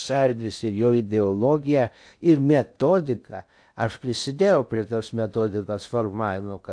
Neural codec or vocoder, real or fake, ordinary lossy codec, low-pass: codec, 16 kHz in and 24 kHz out, 0.6 kbps, FocalCodec, streaming, 2048 codes; fake; AAC, 64 kbps; 9.9 kHz